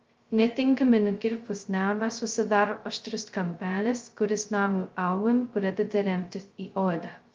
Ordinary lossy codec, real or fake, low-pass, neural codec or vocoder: Opus, 32 kbps; fake; 7.2 kHz; codec, 16 kHz, 0.2 kbps, FocalCodec